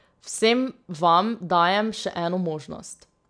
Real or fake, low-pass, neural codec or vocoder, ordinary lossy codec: real; 9.9 kHz; none; none